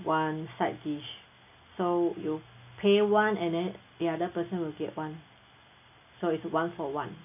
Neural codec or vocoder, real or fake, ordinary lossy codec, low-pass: none; real; none; 3.6 kHz